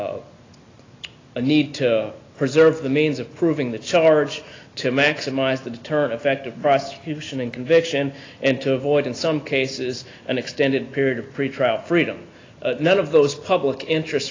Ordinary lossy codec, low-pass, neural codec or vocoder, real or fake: AAC, 32 kbps; 7.2 kHz; none; real